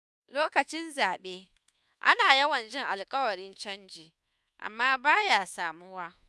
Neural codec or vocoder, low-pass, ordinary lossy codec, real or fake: codec, 24 kHz, 1.2 kbps, DualCodec; none; none; fake